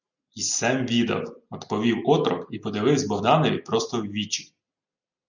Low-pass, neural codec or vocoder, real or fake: 7.2 kHz; none; real